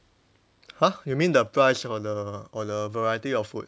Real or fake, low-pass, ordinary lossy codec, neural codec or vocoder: real; none; none; none